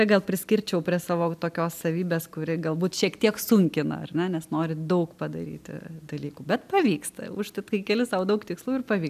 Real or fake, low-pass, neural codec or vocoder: real; 14.4 kHz; none